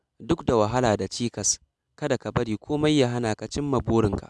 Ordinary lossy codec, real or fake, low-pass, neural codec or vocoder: none; real; none; none